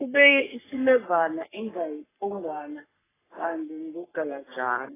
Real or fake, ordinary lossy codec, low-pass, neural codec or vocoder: fake; AAC, 16 kbps; 3.6 kHz; codec, 44.1 kHz, 3.4 kbps, Pupu-Codec